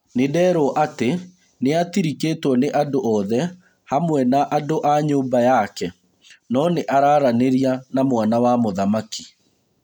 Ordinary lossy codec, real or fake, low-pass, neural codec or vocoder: none; real; 19.8 kHz; none